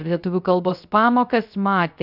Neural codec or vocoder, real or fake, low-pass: codec, 16 kHz, 0.3 kbps, FocalCodec; fake; 5.4 kHz